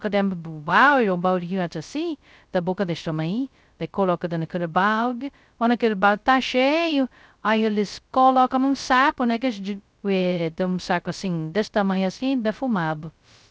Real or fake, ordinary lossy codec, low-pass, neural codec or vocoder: fake; none; none; codec, 16 kHz, 0.2 kbps, FocalCodec